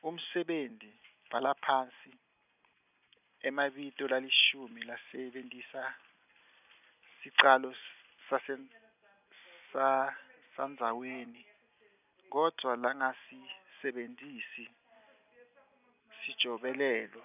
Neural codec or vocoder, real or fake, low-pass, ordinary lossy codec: none; real; 3.6 kHz; none